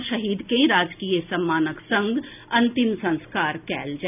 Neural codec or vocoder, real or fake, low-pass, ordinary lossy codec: none; real; 3.6 kHz; none